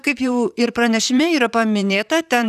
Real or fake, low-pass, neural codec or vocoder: fake; 14.4 kHz; vocoder, 44.1 kHz, 128 mel bands, Pupu-Vocoder